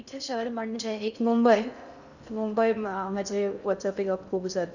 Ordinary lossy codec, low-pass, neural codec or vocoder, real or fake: none; 7.2 kHz; codec, 16 kHz in and 24 kHz out, 0.6 kbps, FocalCodec, streaming, 4096 codes; fake